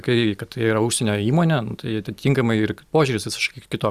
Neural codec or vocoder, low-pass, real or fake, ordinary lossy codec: none; 14.4 kHz; real; Opus, 64 kbps